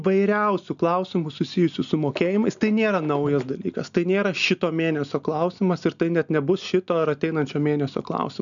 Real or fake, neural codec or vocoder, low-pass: real; none; 7.2 kHz